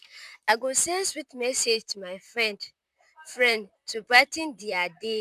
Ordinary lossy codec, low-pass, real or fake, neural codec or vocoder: none; 14.4 kHz; fake; vocoder, 44.1 kHz, 128 mel bands, Pupu-Vocoder